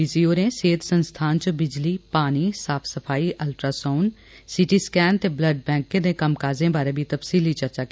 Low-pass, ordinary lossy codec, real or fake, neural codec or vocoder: none; none; real; none